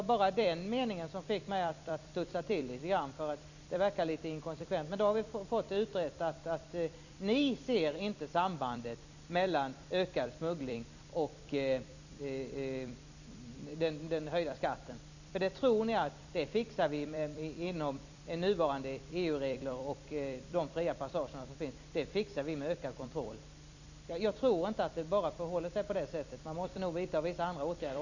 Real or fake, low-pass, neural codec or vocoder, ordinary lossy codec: fake; 7.2 kHz; vocoder, 44.1 kHz, 128 mel bands every 256 samples, BigVGAN v2; none